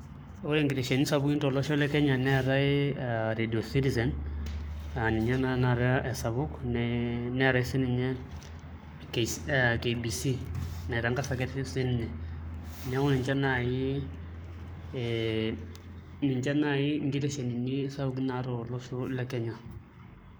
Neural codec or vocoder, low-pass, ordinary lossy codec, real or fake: codec, 44.1 kHz, 7.8 kbps, Pupu-Codec; none; none; fake